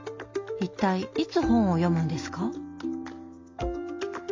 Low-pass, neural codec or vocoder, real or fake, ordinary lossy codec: 7.2 kHz; none; real; MP3, 48 kbps